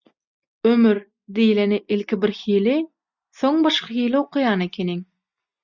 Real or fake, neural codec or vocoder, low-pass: real; none; 7.2 kHz